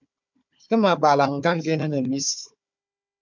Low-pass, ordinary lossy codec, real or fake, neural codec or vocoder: 7.2 kHz; MP3, 64 kbps; fake; codec, 16 kHz, 4 kbps, FunCodec, trained on Chinese and English, 50 frames a second